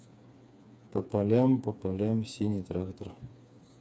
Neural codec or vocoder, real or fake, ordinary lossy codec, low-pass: codec, 16 kHz, 4 kbps, FreqCodec, smaller model; fake; none; none